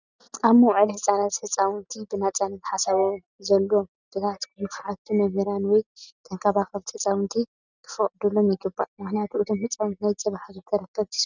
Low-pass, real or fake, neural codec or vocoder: 7.2 kHz; real; none